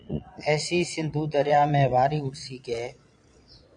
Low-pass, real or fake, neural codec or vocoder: 9.9 kHz; fake; vocoder, 22.05 kHz, 80 mel bands, Vocos